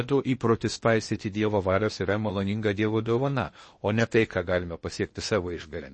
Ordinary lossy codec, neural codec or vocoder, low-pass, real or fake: MP3, 32 kbps; codec, 16 kHz in and 24 kHz out, 0.8 kbps, FocalCodec, streaming, 65536 codes; 10.8 kHz; fake